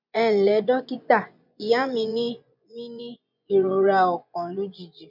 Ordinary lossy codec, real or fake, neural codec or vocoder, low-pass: MP3, 48 kbps; fake; vocoder, 24 kHz, 100 mel bands, Vocos; 5.4 kHz